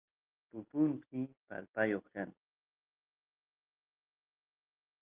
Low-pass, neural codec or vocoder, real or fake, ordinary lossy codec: 3.6 kHz; none; real; Opus, 32 kbps